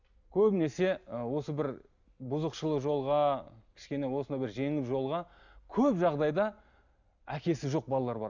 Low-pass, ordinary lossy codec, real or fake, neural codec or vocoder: 7.2 kHz; none; real; none